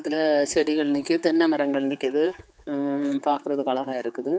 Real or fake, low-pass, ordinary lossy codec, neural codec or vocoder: fake; none; none; codec, 16 kHz, 4 kbps, X-Codec, HuBERT features, trained on general audio